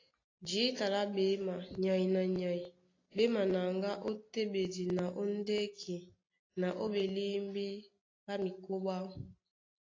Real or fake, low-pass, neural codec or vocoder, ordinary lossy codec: real; 7.2 kHz; none; AAC, 32 kbps